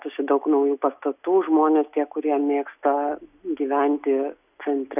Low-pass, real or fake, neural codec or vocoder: 3.6 kHz; real; none